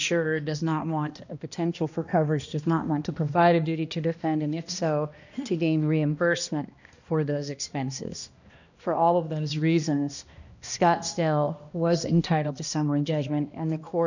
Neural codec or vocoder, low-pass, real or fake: codec, 16 kHz, 1 kbps, X-Codec, HuBERT features, trained on balanced general audio; 7.2 kHz; fake